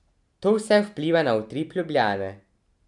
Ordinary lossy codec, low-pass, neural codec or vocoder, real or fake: none; 10.8 kHz; none; real